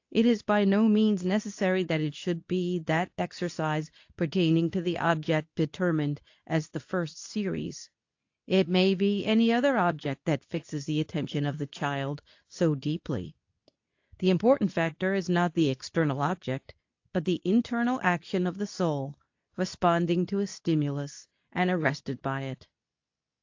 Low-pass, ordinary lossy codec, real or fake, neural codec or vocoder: 7.2 kHz; AAC, 48 kbps; fake; codec, 24 kHz, 0.9 kbps, WavTokenizer, medium speech release version 2